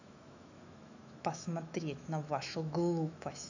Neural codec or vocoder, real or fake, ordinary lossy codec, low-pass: autoencoder, 48 kHz, 128 numbers a frame, DAC-VAE, trained on Japanese speech; fake; none; 7.2 kHz